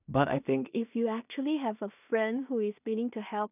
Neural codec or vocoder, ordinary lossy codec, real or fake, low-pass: codec, 16 kHz in and 24 kHz out, 0.4 kbps, LongCat-Audio-Codec, two codebook decoder; none; fake; 3.6 kHz